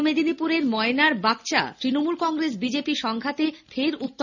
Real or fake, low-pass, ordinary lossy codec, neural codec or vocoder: real; 7.2 kHz; none; none